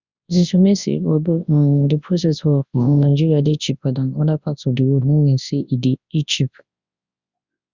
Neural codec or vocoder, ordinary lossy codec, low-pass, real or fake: codec, 24 kHz, 0.9 kbps, WavTokenizer, large speech release; Opus, 64 kbps; 7.2 kHz; fake